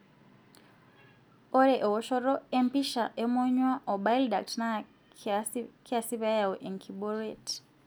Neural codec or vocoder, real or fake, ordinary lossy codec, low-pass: none; real; none; none